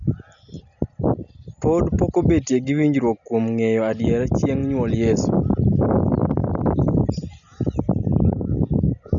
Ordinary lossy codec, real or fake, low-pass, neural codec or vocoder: MP3, 96 kbps; real; 7.2 kHz; none